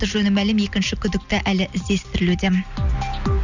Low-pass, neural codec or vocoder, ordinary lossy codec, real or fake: 7.2 kHz; none; none; real